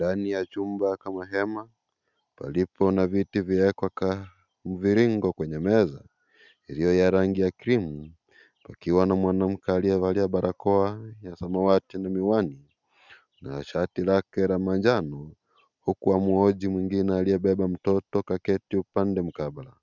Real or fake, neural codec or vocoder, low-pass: real; none; 7.2 kHz